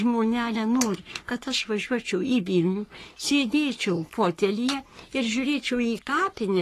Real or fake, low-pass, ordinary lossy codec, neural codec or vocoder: fake; 14.4 kHz; AAC, 48 kbps; codec, 44.1 kHz, 3.4 kbps, Pupu-Codec